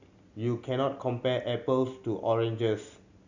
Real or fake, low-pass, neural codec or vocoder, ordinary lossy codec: real; 7.2 kHz; none; Opus, 64 kbps